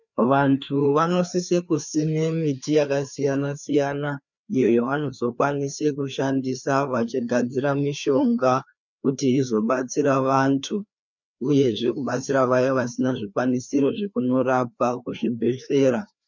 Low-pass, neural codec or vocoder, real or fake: 7.2 kHz; codec, 16 kHz, 2 kbps, FreqCodec, larger model; fake